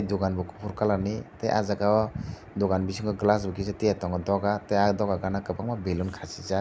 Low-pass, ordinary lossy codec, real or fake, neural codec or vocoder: none; none; real; none